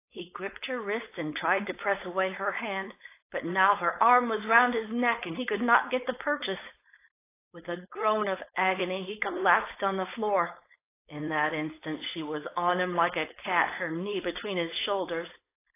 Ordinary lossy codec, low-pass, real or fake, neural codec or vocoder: AAC, 24 kbps; 3.6 kHz; fake; codec, 16 kHz, 4.8 kbps, FACodec